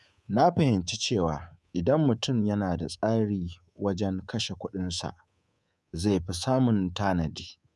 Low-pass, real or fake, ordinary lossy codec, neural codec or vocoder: none; fake; none; codec, 24 kHz, 3.1 kbps, DualCodec